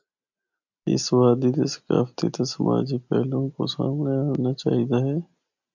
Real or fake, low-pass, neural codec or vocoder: real; 7.2 kHz; none